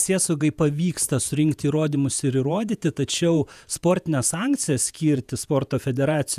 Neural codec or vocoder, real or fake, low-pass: vocoder, 48 kHz, 128 mel bands, Vocos; fake; 14.4 kHz